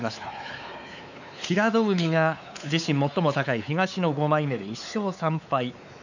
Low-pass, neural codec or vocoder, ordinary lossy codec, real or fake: 7.2 kHz; codec, 16 kHz, 4 kbps, X-Codec, WavLM features, trained on Multilingual LibriSpeech; none; fake